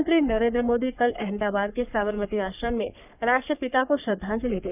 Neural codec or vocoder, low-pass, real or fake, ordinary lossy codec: codec, 44.1 kHz, 3.4 kbps, Pupu-Codec; 3.6 kHz; fake; none